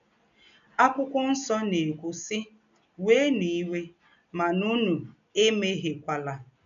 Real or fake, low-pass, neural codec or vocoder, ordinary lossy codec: real; 7.2 kHz; none; none